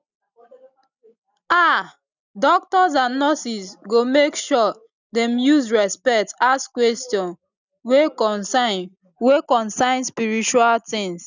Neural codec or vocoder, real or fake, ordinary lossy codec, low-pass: none; real; none; 7.2 kHz